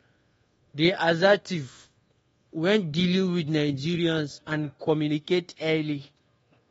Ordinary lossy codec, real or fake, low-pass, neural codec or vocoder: AAC, 24 kbps; fake; 10.8 kHz; codec, 24 kHz, 1.2 kbps, DualCodec